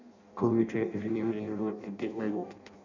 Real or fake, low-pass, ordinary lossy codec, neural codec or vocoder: fake; 7.2 kHz; none; codec, 16 kHz in and 24 kHz out, 0.6 kbps, FireRedTTS-2 codec